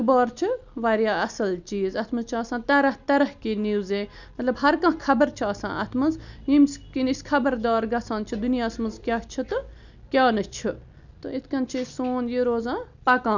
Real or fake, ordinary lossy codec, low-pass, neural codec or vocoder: real; none; 7.2 kHz; none